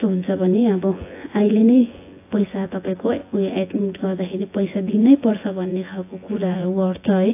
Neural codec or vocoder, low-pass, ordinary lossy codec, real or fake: vocoder, 24 kHz, 100 mel bands, Vocos; 3.6 kHz; AAC, 24 kbps; fake